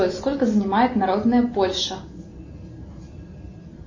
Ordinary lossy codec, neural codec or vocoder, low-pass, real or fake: MP3, 32 kbps; none; 7.2 kHz; real